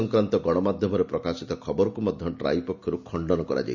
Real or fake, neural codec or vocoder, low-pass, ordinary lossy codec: real; none; 7.2 kHz; Opus, 64 kbps